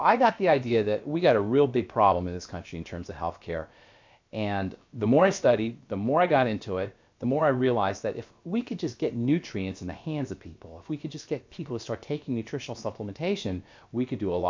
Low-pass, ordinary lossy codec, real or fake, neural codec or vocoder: 7.2 kHz; MP3, 64 kbps; fake; codec, 16 kHz, about 1 kbps, DyCAST, with the encoder's durations